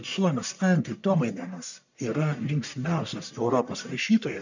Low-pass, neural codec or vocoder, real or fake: 7.2 kHz; codec, 44.1 kHz, 1.7 kbps, Pupu-Codec; fake